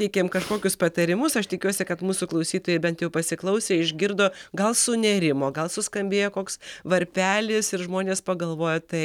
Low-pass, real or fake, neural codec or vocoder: 19.8 kHz; real; none